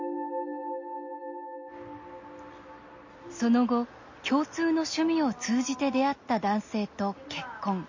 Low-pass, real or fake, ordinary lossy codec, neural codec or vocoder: 7.2 kHz; real; MP3, 32 kbps; none